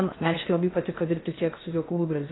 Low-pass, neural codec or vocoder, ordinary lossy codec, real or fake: 7.2 kHz; codec, 16 kHz in and 24 kHz out, 0.6 kbps, FocalCodec, streaming, 2048 codes; AAC, 16 kbps; fake